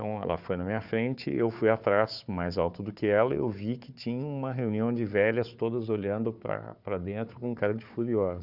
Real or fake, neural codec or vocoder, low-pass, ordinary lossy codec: fake; codec, 16 kHz, 4 kbps, FunCodec, trained on Chinese and English, 50 frames a second; 5.4 kHz; none